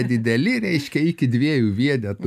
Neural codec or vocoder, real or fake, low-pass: none; real; 14.4 kHz